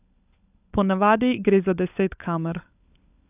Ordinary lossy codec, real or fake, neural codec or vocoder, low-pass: none; fake; codec, 16 kHz, 16 kbps, FunCodec, trained on LibriTTS, 50 frames a second; 3.6 kHz